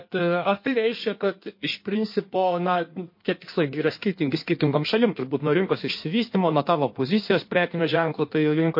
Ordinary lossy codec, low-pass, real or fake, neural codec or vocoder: MP3, 32 kbps; 5.4 kHz; fake; codec, 16 kHz in and 24 kHz out, 1.1 kbps, FireRedTTS-2 codec